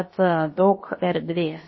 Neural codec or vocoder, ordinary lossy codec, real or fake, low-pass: codec, 16 kHz, about 1 kbps, DyCAST, with the encoder's durations; MP3, 24 kbps; fake; 7.2 kHz